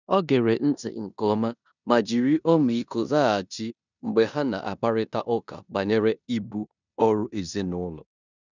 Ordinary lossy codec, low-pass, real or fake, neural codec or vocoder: none; 7.2 kHz; fake; codec, 16 kHz in and 24 kHz out, 0.9 kbps, LongCat-Audio-Codec, four codebook decoder